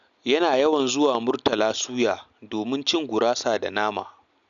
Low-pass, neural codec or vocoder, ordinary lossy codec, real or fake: 7.2 kHz; none; none; real